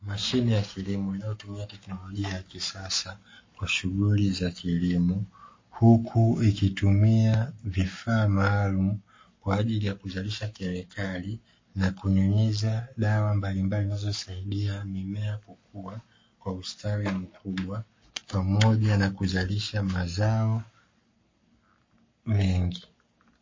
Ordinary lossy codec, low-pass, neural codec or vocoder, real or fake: MP3, 32 kbps; 7.2 kHz; codec, 44.1 kHz, 7.8 kbps, Pupu-Codec; fake